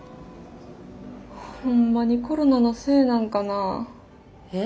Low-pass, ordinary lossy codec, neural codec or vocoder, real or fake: none; none; none; real